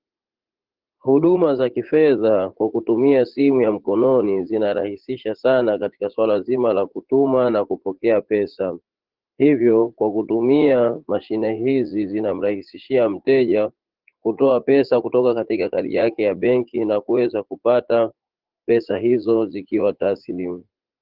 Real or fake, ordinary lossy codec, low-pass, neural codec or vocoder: fake; Opus, 16 kbps; 5.4 kHz; vocoder, 44.1 kHz, 80 mel bands, Vocos